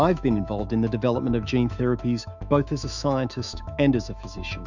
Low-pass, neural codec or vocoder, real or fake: 7.2 kHz; autoencoder, 48 kHz, 128 numbers a frame, DAC-VAE, trained on Japanese speech; fake